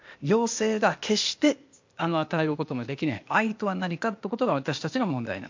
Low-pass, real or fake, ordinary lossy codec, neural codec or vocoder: 7.2 kHz; fake; MP3, 48 kbps; codec, 16 kHz, 0.8 kbps, ZipCodec